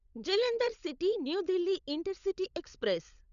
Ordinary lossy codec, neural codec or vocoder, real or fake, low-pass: none; codec, 16 kHz, 16 kbps, FunCodec, trained on LibriTTS, 50 frames a second; fake; 7.2 kHz